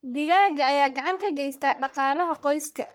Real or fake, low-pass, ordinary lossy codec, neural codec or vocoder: fake; none; none; codec, 44.1 kHz, 1.7 kbps, Pupu-Codec